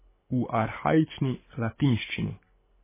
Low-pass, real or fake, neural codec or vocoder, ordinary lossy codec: 3.6 kHz; fake; codec, 24 kHz, 6 kbps, HILCodec; MP3, 16 kbps